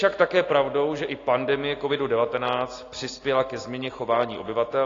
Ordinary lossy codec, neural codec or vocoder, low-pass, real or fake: AAC, 32 kbps; none; 7.2 kHz; real